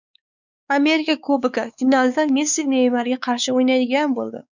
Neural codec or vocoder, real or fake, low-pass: codec, 16 kHz, 4 kbps, X-Codec, WavLM features, trained on Multilingual LibriSpeech; fake; 7.2 kHz